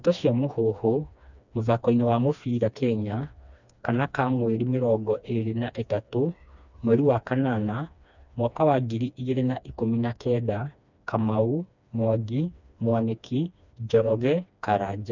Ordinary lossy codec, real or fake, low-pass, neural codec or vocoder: none; fake; 7.2 kHz; codec, 16 kHz, 2 kbps, FreqCodec, smaller model